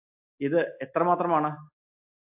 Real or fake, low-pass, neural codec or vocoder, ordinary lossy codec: real; 3.6 kHz; none; AAC, 32 kbps